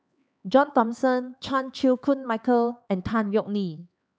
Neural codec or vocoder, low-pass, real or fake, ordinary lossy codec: codec, 16 kHz, 4 kbps, X-Codec, HuBERT features, trained on LibriSpeech; none; fake; none